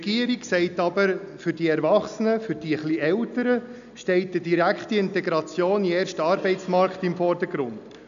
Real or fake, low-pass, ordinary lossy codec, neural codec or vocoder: real; 7.2 kHz; none; none